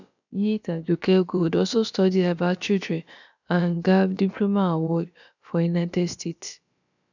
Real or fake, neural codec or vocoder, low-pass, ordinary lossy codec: fake; codec, 16 kHz, about 1 kbps, DyCAST, with the encoder's durations; 7.2 kHz; none